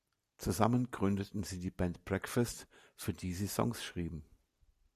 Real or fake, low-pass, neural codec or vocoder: real; 14.4 kHz; none